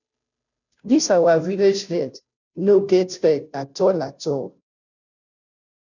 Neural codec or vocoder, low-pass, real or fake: codec, 16 kHz, 0.5 kbps, FunCodec, trained on Chinese and English, 25 frames a second; 7.2 kHz; fake